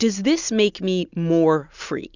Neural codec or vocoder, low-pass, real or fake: none; 7.2 kHz; real